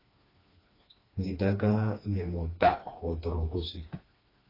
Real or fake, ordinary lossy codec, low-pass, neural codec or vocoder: fake; AAC, 24 kbps; 5.4 kHz; codec, 16 kHz, 2 kbps, FreqCodec, smaller model